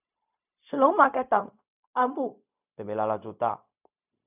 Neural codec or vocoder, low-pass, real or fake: codec, 16 kHz, 0.4 kbps, LongCat-Audio-Codec; 3.6 kHz; fake